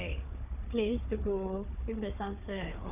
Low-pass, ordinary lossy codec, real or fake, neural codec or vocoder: 3.6 kHz; none; fake; codec, 16 kHz, 4 kbps, FunCodec, trained on Chinese and English, 50 frames a second